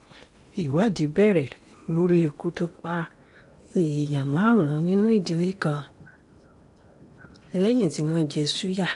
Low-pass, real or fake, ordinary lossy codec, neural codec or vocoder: 10.8 kHz; fake; MP3, 64 kbps; codec, 16 kHz in and 24 kHz out, 0.8 kbps, FocalCodec, streaming, 65536 codes